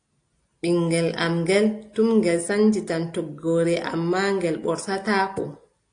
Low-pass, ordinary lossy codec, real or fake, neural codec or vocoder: 9.9 kHz; AAC, 48 kbps; real; none